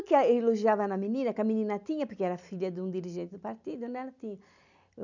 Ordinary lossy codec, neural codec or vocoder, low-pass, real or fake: none; none; 7.2 kHz; real